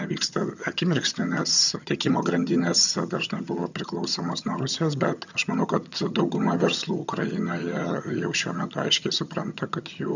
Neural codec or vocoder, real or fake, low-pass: vocoder, 22.05 kHz, 80 mel bands, HiFi-GAN; fake; 7.2 kHz